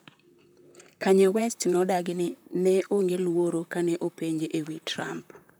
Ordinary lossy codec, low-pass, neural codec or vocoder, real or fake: none; none; vocoder, 44.1 kHz, 128 mel bands, Pupu-Vocoder; fake